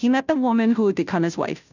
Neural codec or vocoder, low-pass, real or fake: codec, 16 kHz, 0.5 kbps, FunCodec, trained on Chinese and English, 25 frames a second; 7.2 kHz; fake